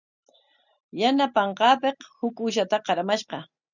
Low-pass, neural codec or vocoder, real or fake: 7.2 kHz; none; real